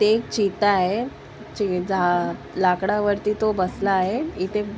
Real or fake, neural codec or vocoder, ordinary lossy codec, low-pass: real; none; none; none